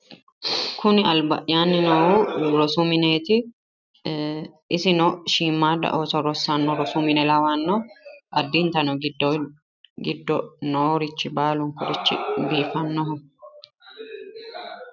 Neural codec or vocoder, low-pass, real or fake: none; 7.2 kHz; real